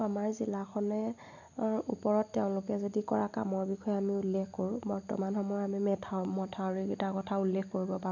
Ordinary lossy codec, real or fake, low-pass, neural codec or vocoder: none; real; 7.2 kHz; none